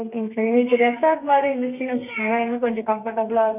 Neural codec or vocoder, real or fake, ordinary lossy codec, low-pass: codec, 32 kHz, 1.9 kbps, SNAC; fake; none; 3.6 kHz